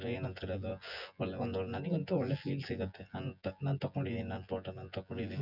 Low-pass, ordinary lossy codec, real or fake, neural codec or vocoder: 5.4 kHz; none; fake; vocoder, 24 kHz, 100 mel bands, Vocos